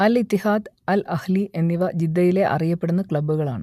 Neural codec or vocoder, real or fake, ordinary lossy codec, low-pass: none; real; MP3, 96 kbps; 14.4 kHz